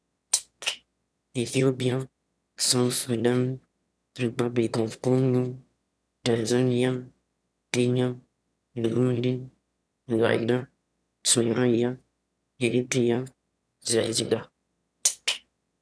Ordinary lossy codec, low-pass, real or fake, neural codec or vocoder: none; none; fake; autoencoder, 22.05 kHz, a latent of 192 numbers a frame, VITS, trained on one speaker